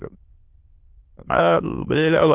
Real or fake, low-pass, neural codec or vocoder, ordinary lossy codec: fake; 3.6 kHz; autoencoder, 22.05 kHz, a latent of 192 numbers a frame, VITS, trained on many speakers; Opus, 16 kbps